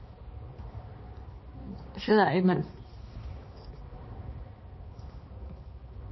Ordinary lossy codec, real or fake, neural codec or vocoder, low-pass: MP3, 24 kbps; fake; codec, 16 kHz, 2 kbps, X-Codec, HuBERT features, trained on balanced general audio; 7.2 kHz